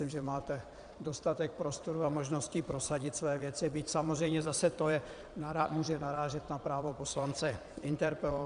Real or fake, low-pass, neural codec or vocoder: fake; 9.9 kHz; vocoder, 22.05 kHz, 80 mel bands, Vocos